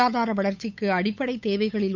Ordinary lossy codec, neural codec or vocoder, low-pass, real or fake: none; codec, 16 kHz, 8 kbps, FunCodec, trained on Chinese and English, 25 frames a second; 7.2 kHz; fake